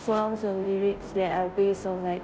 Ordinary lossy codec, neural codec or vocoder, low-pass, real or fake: none; codec, 16 kHz, 0.5 kbps, FunCodec, trained on Chinese and English, 25 frames a second; none; fake